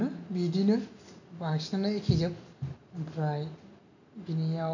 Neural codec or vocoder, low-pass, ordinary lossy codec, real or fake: none; 7.2 kHz; none; real